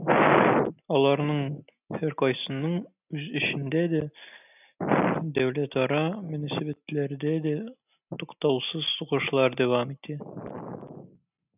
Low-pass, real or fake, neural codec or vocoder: 3.6 kHz; real; none